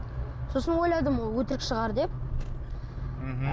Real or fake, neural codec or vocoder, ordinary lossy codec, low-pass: real; none; none; none